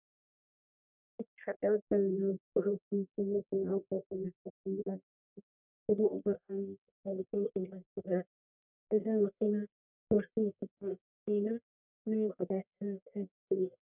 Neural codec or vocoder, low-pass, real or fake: codec, 44.1 kHz, 1.7 kbps, Pupu-Codec; 3.6 kHz; fake